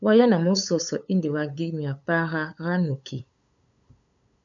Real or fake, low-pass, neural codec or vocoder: fake; 7.2 kHz; codec, 16 kHz, 8 kbps, FunCodec, trained on LibriTTS, 25 frames a second